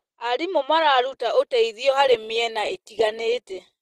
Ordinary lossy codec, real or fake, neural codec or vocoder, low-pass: Opus, 16 kbps; real; none; 14.4 kHz